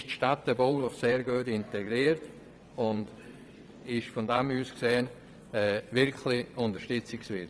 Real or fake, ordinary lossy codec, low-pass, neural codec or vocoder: fake; none; none; vocoder, 22.05 kHz, 80 mel bands, WaveNeXt